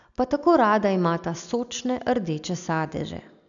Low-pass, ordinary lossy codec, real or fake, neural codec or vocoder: 7.2 kHz; none; real; none